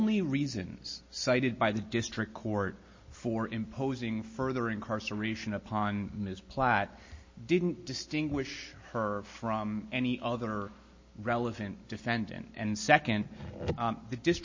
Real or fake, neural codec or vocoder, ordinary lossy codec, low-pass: real; none; MP3, 32 kbps; 7.2 kHz